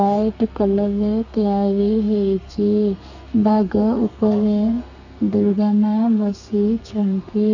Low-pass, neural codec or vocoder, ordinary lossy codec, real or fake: 7.2 kHz; codec, 32 kHz, 1.9 kbps, SNAC; none; fake